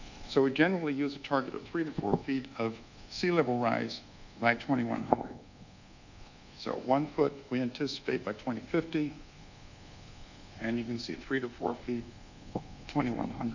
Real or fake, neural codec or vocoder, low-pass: fake; codec, 24 kHz, 1.2 kbps, DualCodec; 7.2 kHz